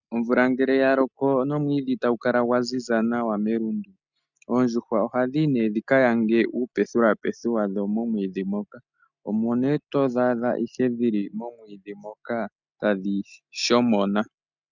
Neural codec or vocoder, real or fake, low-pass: none; real; 7.2 kHz